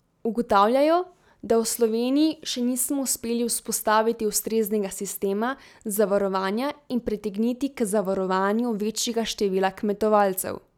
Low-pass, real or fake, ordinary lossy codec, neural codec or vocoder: 19.8 kHz; real; none; none